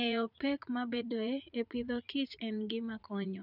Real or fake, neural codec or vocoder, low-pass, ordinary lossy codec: fake; vocoder, 44.1 kHz, 80 mel bands, Vocos; 5.4 kHz; none